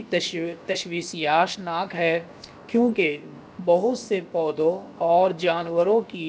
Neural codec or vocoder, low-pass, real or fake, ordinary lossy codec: codec, 16 kHz, 0.7 kbps, FocalCodec; none; fake; none